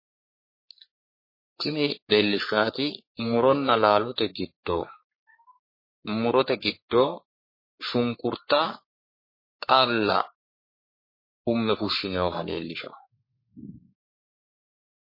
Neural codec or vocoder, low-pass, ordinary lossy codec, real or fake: codec, 44.1 kHz, 3.4 kbps, Pupu-Codec; 5.4 kHz; MP3, 24 kbps; fake